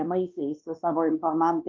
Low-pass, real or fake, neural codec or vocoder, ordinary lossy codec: 7.2 kHz; fake; codec, 16 kHz, 2 kbps, X-Codec, WavLM features, trained on Multilingual LibriSpeech; Opus, 32 kbps